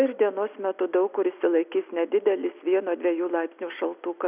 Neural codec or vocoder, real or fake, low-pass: none; real; 3.6 kHz